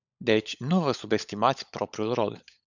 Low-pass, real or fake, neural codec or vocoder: 7.2 kHz; fake; codec, 16 kHz, 16 kbps, FunCodec, trained on LibriTTS, 50 frames a second